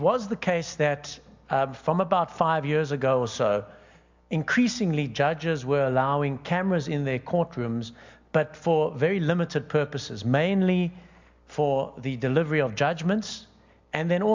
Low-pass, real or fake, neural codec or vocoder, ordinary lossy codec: 7.2 kHz; real; none; MP3, 64 kbps